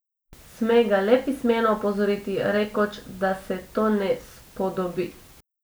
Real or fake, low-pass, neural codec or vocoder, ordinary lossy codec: real; none; none; none